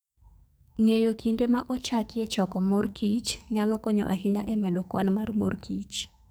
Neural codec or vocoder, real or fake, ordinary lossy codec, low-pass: codec, 44.1 kHz, 2.6 kbps, SNAC; fake; none; none